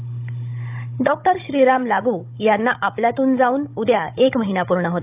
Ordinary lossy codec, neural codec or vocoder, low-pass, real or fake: AAC, 32 kbps; codec, 16 kHz, 16 kbps, FunCodec, trained on Chinese and English, 50 frames a second; 3.6 kHz; fake